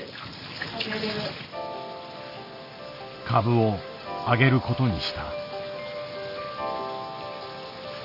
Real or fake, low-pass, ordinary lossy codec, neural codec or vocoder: real; 5.4 kHz; none; none